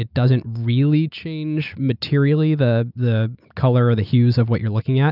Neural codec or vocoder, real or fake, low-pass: none; real; 5.4 kHz